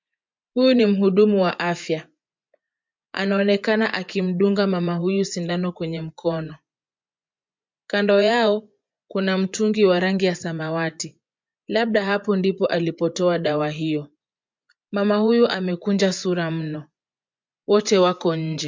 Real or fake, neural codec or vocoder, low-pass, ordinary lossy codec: fake; vocoder, 24 kHz, 100 mel bands, Vocos; 7.2 kHz; MP3, 64 kbps